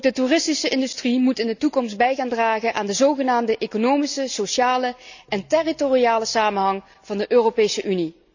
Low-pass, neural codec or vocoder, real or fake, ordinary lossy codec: 7.2 kHz; none; real; none